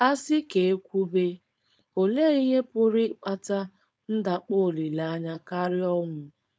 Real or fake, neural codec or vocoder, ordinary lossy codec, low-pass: fake; codec, 16 kHz, 4.8 kbps, FACodec; none; none